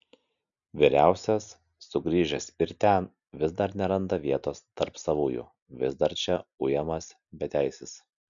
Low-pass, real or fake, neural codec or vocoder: 7.2 kHz; real; none